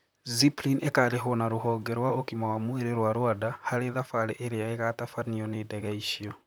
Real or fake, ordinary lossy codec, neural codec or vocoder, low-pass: fake; none; vocoder, 44.1 kHz, 128 mel bands, Pupu-Vocoder; none